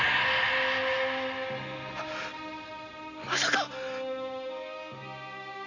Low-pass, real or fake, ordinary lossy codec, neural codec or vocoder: 7.2 kHz; real; none; none